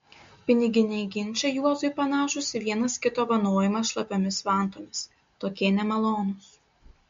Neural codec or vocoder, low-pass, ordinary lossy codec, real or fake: none; 7.2 kHz; MP3, 48 kbps; real